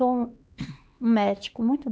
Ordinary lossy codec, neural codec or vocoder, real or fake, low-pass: none; codec, 16 kHz, 2 kbps, X-Codec, HuBERT features, trained on LibriSpeech; fake; none